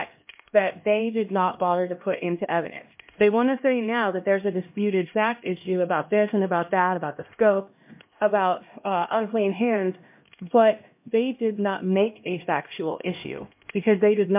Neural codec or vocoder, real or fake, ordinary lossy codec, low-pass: codec, 16 kHz, 1 kbps, X-Codec, HuBERT features, trained on LibriSpeech; fake; MP3, 32 kbps; 3.6 kHz